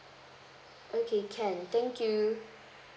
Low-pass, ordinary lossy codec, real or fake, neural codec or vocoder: none; none; real; none